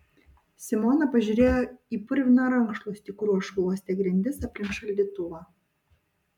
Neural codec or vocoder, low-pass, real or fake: none; 19.8 kHz; real